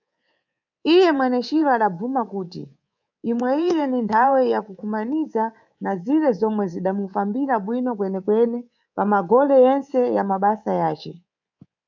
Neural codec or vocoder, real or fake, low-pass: codec, 24 kHz, 3.1 kbps, DualCodec; fake; 7.2 kHz